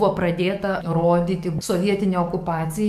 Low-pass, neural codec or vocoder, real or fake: 14.4 kHz; codec, 44.1 kHz, 7.8 kbps, DAC; fake